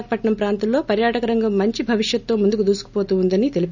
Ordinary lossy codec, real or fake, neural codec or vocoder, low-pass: none; real; none; 7.2 kHz